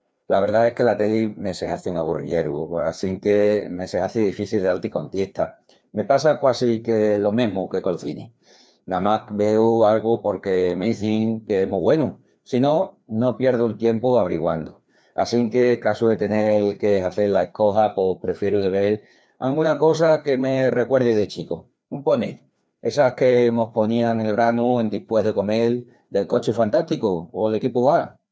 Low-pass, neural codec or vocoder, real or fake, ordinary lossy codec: none; codec, 16 kHz, 2 kbps, FreqCodec, larger model; fake; none